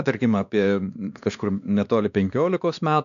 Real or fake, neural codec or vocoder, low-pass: fake; codec, 16 kHz, 2 kbps, X-Codec, WavLM features, trained on Multilingual LibriSpeech; 7.2 kHz